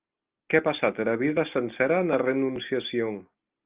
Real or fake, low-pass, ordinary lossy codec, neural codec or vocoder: real; 3.6 kHz; Opus, 32 kbps; none